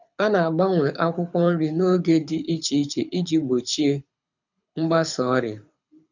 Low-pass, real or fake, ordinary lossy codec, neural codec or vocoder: 7.2 kHz; fake; none; codec, 24 kHz, 6 kbps, HILCodec